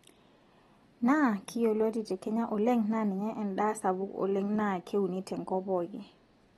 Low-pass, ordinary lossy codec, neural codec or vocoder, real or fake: 19.8 kHz; AAC, 32 kbps; none; real